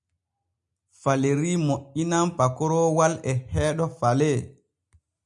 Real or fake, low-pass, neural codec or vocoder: real; 10.8 kHz; none